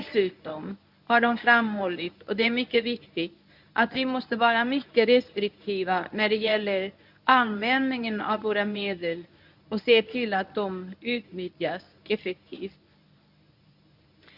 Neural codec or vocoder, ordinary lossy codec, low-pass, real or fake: codec, 24 kHz, 0.9 kbps, WavTokenizer, medium speech release version 1; none; 5.4 kHz; fake